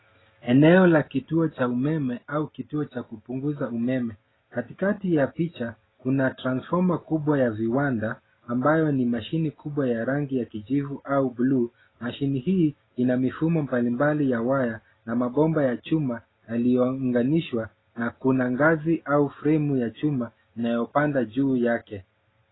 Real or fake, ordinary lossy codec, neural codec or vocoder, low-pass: real; AAC, 16 kbps; none; 7.2 kHz